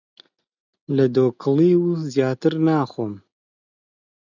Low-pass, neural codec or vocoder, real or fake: 7.2 kHz; none; real